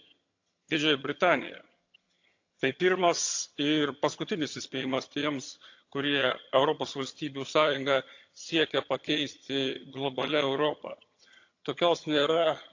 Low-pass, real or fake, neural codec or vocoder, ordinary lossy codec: 7.2 kHz; fake; vocoder, 22.05 kHz, 80 mel bands, HiFi-GAN; AAC, 48 kbps